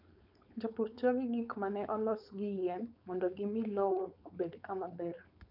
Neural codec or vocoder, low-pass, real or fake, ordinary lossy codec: codec, 16 kHz, 4.8 kbps, FACodec; 5.4 kHz; fake; none